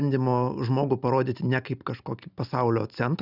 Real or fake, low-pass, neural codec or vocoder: real; 5.4 kHz; none